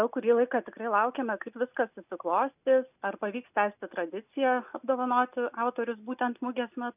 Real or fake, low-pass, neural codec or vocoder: real; 3.6 kHz; none